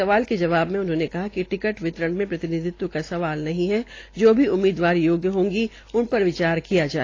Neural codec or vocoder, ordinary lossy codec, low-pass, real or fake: none; AAC, 32 kbps; 7.2 kHz; real